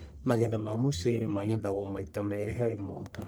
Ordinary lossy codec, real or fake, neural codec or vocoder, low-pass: none; fake; codec, 44.1 kHz, 1.7 kbps, Pupu-Codec; none